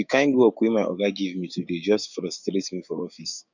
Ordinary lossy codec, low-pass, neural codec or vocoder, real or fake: none; 7.2 kHz; codec, 16 kHz, 6 kbps, DAC; fake